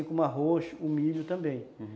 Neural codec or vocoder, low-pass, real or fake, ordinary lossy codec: none; none; real; none